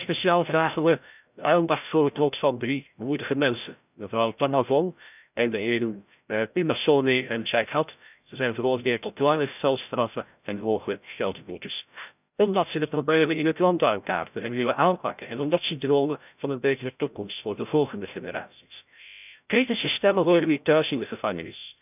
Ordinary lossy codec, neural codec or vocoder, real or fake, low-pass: none; codec, 16 kHz, 0.5 kbps, FreqCodec, larger model; fake; 3.6 kHz